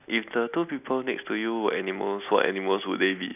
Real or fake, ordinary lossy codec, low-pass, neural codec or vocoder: real; none; 3.6 kHz; none